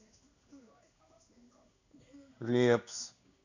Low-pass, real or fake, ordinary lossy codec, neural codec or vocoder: 7.2 kHz; fake; none; codec, 16 kHz in and 24 kHz out, 1 kbps, XY-Tokenizer